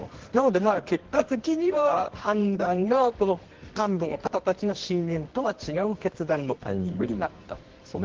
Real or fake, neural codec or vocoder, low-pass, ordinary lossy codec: fake; codec, 24 kHz, 0.9 kbps, WavTokenizer, medium music audio release; 7.2 kHz; Opus, 16 kbps